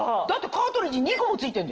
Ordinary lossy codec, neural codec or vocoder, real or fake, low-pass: Opus, 16 kbps; none; real; 7.2 kHz